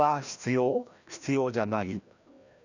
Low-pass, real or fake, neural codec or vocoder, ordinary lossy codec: 7.2 kHz; fake; codec, 16 kHz, 1 kbps, FreqCodec, larger model; none